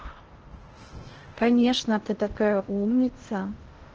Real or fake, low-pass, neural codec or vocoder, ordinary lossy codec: fake; 7.2 kHz; codec, 16 kHz in and 24 kHz out, 0.6 kbps, FocalCodec, streaming, 2048 codes; Opus, 16 kbps